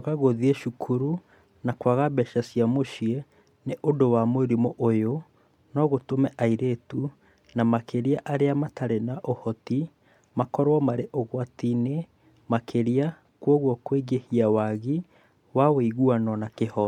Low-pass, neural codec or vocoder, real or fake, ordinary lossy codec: 19.8 kHz; none; real; MP3, 96 kbps